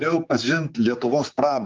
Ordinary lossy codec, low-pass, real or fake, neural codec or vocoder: Opus, 24 kbps; 7.2 kHz; fake; codec, 16 kHz, 4 kbps, X-Codec, HuBERT features, trained on general audio